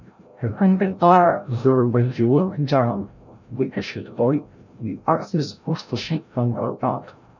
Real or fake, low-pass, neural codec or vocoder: fake; 7.2 kHz; codec, 16 kHz, 0.5 kbps, FreqCodec, larger model